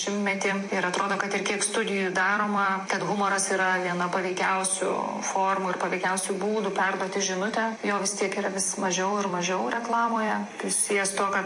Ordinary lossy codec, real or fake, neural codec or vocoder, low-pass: MP3, 48 kbps; fake; vocoder, 44.1 kHz, 128 mel bands every 256 samples, BigVGAN v2; 10.8 kHz